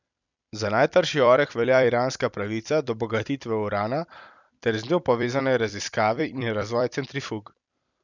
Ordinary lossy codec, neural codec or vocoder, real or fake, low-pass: none; vocoder, 44.1 kHz, 128 mel bands every 256 samples, BigVGAN v2; fake; 7.2 kHz